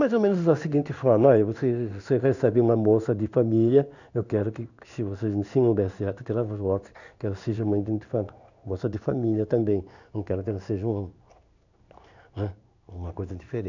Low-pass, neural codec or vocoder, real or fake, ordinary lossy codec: 7.2 kHz; codec, 16 kHz in and 24 kHz out, 1 kbps, XY-Tokenizer; fake; none